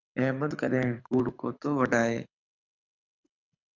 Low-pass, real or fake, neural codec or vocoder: 7.2 kHz; fake; codec, 24 kHz, 6 kbps, HILCodec